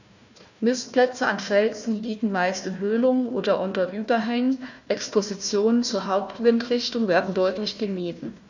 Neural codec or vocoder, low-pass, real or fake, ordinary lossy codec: codec, 16 kHz, 1 kbps, FunCodec, trained on Chinese and English, 50 frames a second; 7.2 kHz; fake; none